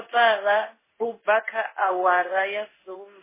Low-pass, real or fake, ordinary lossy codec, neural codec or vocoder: 3.6 kHz; fake; MP3, 16 kbps; codec, 16 kHz in and 24 kHz out, 1 kbps, XY-Tokenizer